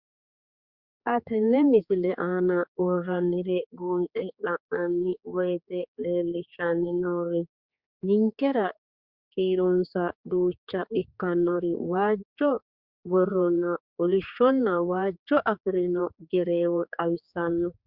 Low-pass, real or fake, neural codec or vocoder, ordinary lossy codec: 5.4 kHz; fake; codec, 16 kHz, 4 kbps, X-Codec, HuBERT features, trained on general audio; Opus, 64 kbps